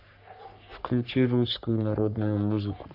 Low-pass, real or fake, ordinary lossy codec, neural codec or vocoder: 5.4 kHz; fake; none; codec, 44.1 kHz, 3.4 kbps, Pupu-Codec